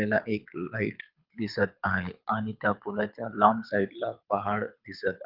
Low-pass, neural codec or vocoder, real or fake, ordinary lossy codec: 5.4 kHz; codec, 24 kHz, 6 kbps, HILCodec; fake; Opus, 24 kbps